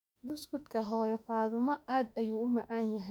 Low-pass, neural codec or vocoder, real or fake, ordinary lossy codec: 19.8 kHz; autoencoder, 48 kHz, 32 numbers a frame, DAC-VAE, trained on Japanese speech; fake; none